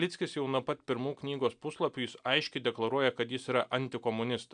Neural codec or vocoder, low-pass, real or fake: none; 9.9 kHz; real